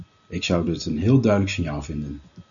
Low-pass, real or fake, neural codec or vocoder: 7.2 kHz; real; none